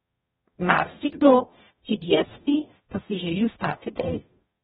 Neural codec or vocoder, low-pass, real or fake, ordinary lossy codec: codec, 44.1 kHz, 0.9 kbps, DAC; 19.8 kHz; fake; AAC, 16 kbps